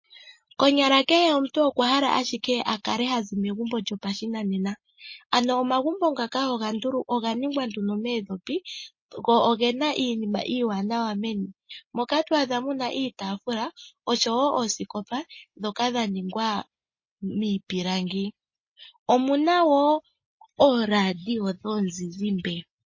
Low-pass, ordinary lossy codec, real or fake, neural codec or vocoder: 7.2 kHz; MP3, 32 kbps; real; none